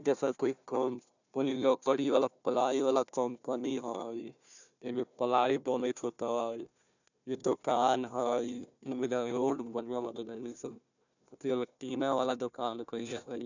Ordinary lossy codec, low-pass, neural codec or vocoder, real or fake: none; 7.2 kHz; codec, 16 kHz, 1 kbps, FunCodec, trained on Chinese and English, 50 frames a second; fake